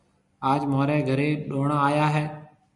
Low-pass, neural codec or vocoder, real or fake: 10.8 kHz; none; real